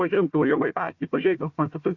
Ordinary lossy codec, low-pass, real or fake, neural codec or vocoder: Opus, 64 kbps; 7.2 kHz; fake; codec, 16 kHz, 1 kbps, FunCodec, trained on Chinese and English, 50 frames a second